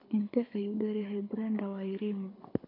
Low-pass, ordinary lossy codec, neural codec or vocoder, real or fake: 5.4 kHz; none; codec, 24 kHz, 6 kbps, HILCodec; fake